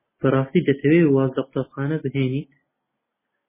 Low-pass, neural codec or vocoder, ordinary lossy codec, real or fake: 3.6 kHz; none; MP3, 16 kbps; real